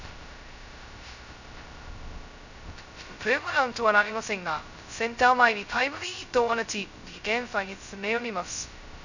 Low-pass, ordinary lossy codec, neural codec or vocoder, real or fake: 7.2 kHz; none; codec, 16 kHz, 0.2 kbps, FocalCodec; fake